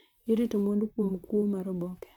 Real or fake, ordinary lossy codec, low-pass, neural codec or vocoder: fake; none; 19.8 kHz; vocoder, 44.1 kHz, 128 mel bands, Pupu-Vocoder